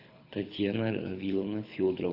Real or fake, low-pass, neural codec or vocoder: fake; 5.4 kHz; codec, 24 kHz, 6 kbps, HILCodec